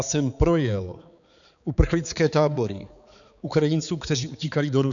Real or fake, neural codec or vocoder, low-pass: fake; codec, 16 kHz, 4 kbps, X-Codec, HuBERT features, trained on balanced general audio; 7.2 kHz